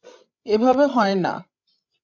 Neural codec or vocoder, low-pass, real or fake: codec, 16 kHz, 16 kbps, FreqCodec, larger model; 7.2 kHz; fake